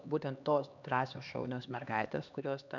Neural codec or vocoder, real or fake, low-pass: codec, 16 kHz, 2 kbps, X-Codec, HuBERT features, trained on LibriSpeech; fake; 7.2 kHz